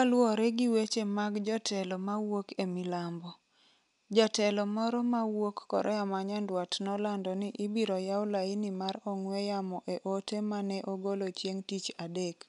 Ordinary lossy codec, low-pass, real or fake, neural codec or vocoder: none; 10.8 kHz; real; none